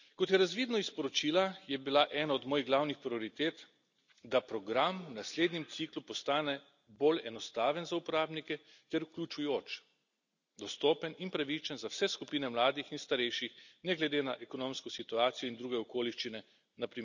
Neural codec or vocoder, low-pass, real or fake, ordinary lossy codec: none; 7.2 kHz; real; none